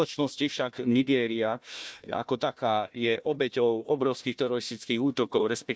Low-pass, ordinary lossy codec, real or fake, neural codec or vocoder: none; none; fake; codec, 16 kHz, 1 kbps, FunCodec, trained on Chinese and English, 50 frames a second